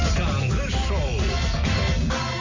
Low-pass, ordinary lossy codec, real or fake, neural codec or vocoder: 7.2 kHz; AAC, 48 kbps; real; none